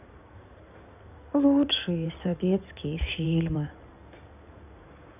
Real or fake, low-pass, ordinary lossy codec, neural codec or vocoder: fake; 3.6 kHz; none; codec, 16 kHz in and 24 kHz out, 2.2 kbps, FireRedTTS-2 codec